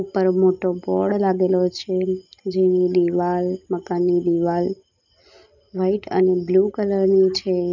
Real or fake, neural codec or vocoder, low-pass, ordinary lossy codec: real; none; 7.2 kHz; none